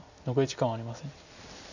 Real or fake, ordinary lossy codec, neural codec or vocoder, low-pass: real; none; none; 7.2 kHz